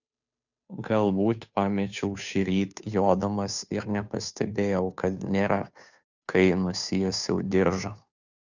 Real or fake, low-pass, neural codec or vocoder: fake; 7.2 kHz; codec, 16 kHz, 2 kbps, FunCodec, trained on Chinese and English, 25 frames a second